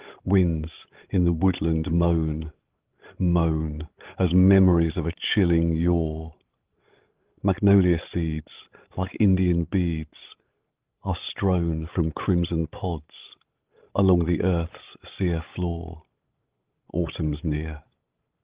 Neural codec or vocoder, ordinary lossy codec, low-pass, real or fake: codec, 16 kHz, 16 kbps, FreqCodec, larger model; Opus, 16 kbps; 3.6 kHz; fake